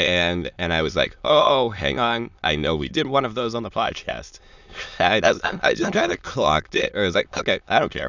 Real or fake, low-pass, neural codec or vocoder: fake; 7.2 kHz; autoencoder, 22.05 kHz, a latent of 192 numbers a frame, VITS, trained on many speakers